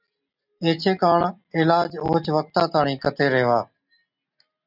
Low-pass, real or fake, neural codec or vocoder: 5.4 kHz; real; none